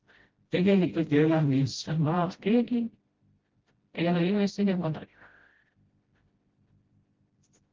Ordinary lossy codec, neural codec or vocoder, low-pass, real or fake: Opus, 16 kbps; codec, 16 kHz, 0.5 kbps, FreqCodec, smaller model; 7.2 kHz; fake